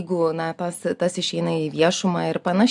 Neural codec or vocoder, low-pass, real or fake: none; 10.8 kHz; real